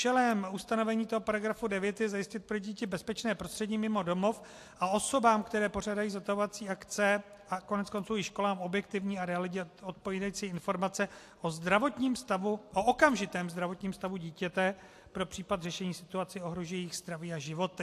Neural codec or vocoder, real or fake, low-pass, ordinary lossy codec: none; real; 14.4 kHz; AAC, 64 kbps